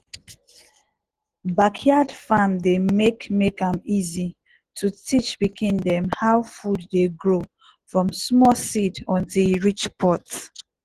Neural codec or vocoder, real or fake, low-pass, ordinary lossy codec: none; real; 14.4 kHz; Opus, 16 kbps